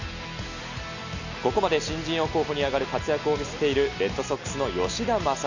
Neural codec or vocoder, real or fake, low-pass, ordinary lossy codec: none; real; 7.2 kHz; none